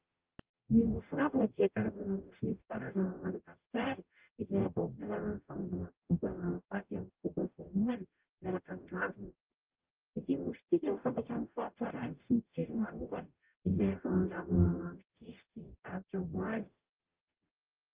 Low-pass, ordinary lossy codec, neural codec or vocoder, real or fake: 3.6 kHz; Opus, 24 kbps; codec, 44.1 kHz, 0.9 kbps, DAC; fake